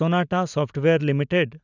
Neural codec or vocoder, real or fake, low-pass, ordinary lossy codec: none; real; 7.2 kHz; none